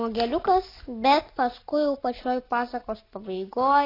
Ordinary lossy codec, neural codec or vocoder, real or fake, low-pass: AAC, 24 kbps; none; real; 5.4 kHz